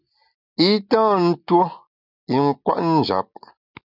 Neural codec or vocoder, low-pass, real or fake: none; 5.4 kHz; real